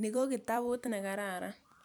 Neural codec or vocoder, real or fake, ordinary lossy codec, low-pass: vocoder, 44.1 kHz, 128 mel bands every 256 samples, BigVGAN v2; fake; none; none